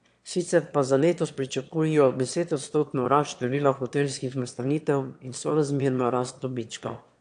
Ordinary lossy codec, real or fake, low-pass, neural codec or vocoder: AAC, 64 kbps; fake; 9.9 kHz; autoencoder, 22.05 kHz, a latent of 192 numbers a frame, VITS, trained on one speaker